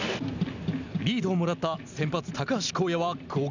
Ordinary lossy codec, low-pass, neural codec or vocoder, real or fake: none; 7.2 kHz; none; real